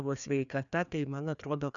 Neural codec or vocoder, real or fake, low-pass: codec, 16 kHz, 2 kbps, FreqCodec, larger model; fake; 7.2 kHz